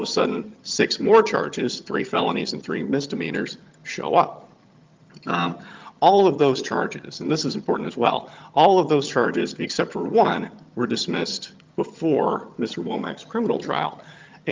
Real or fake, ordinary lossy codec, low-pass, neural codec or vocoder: fake; Opus, 24 kbps; 7.2 kHz; vocoder, 22.05 kHz, 80 mel bands, HiFi-GAN